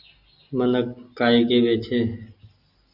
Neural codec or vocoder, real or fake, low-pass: none; real; 5.4 kHz